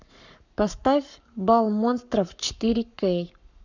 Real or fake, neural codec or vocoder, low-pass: fake; codec, 44.1 kHz, 7.8 kbps, Pupu-Codec; 7.2 kHz